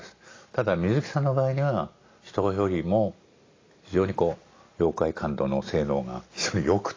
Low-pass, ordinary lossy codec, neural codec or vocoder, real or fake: 7.2 kHz; AAC, 32 kbps; none; real